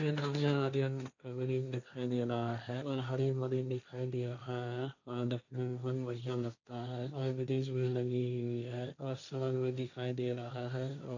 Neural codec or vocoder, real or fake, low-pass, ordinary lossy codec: codec, 16 kHz, 1.1 kbps, Voila-Tokenizer; fake; 7.2 kHz; none